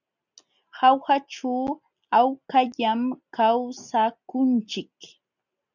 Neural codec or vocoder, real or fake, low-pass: none; real; 7.2 kHz